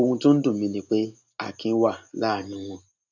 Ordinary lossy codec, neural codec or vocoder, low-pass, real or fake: none; none; 7.2 kHz; real